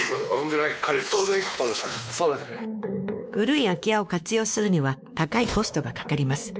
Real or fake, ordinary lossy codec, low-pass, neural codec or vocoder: fake; none; none; codec, 16 kHz, 2 kbps, X-Codec, WavLM features, trained on Multilingual LibriSpeech